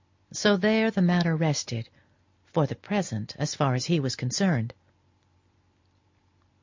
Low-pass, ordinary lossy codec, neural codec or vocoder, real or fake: 7.2 kHz; MP3, 64 kbps; none; real